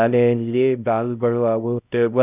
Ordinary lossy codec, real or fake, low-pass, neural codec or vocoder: none; fake; 3.6 kHz; codec, 16 kHz, 0.5 kbps, X-Codec, HuBERT features, trained on LibriSpeech